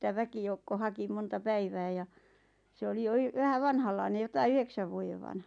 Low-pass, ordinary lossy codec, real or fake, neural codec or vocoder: 9.9 kHz; none; real; none